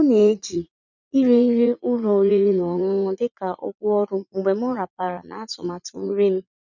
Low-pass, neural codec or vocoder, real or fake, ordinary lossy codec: 7.2 kHz; vocoder, 44.1 kHz, 80 mel bands, Vocos; fake; none